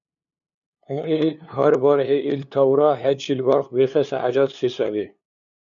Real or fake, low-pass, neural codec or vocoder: fake; 7.2 kHz; codec, 16 kHz, 2 kbps, FunCodec, trained on LibriTTS, 25 frames a second